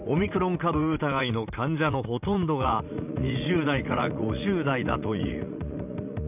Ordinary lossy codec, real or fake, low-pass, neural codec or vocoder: none; fake; 3.6 kHz; vocoder, 44.1 kHz, 80 mel bands, Vocos